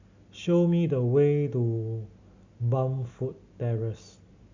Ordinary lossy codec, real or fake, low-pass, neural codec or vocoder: MP3, 64 kbps; real; 7.2 kHz; none